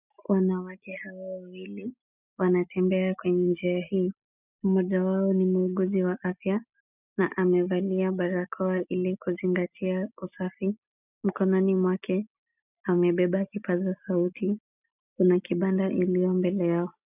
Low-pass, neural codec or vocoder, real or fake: 3.6 kHz; none; real